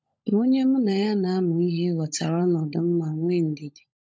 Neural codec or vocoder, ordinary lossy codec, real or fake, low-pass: codec, 16 kHz, 16 kbps, FunCodec, trained on LibriTTS, 50 frames a second; none; fake; none